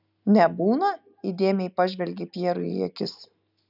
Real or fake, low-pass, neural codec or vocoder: real; 5.4 kHz; none